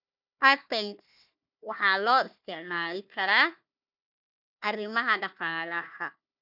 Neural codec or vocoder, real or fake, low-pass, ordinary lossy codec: codec, 16 kHz, 1 kbps, FunCodec, trained on Chinese and English, 50 frames a second; fake; 5.4 kHz; none